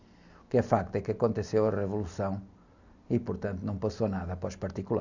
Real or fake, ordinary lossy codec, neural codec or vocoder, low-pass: real; none; none; 7.2 kHz